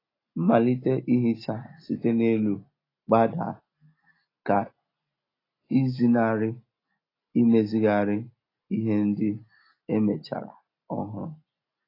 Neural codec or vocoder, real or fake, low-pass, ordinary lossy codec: none; real; 5.4 kHz; AAC, 24 kbps